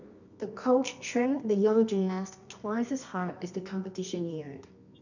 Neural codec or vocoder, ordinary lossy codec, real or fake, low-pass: codec, 24 kHz, 0.9 kbps, WavTokenizer, medium music audio release; none; fake; 7.2 kHz